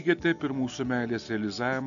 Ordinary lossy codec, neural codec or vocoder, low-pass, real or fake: AAC, 64 kbps; none; 7.2 kHz; real